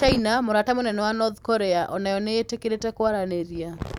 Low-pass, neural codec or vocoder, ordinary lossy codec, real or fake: 19.8 kHz; vocoder, 44.1 kHz, 128 mel bands every 512 samples, BigVGAN v2; none; fake